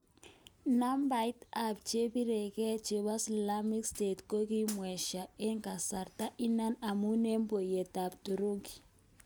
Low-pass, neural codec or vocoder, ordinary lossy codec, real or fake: none; none; none; real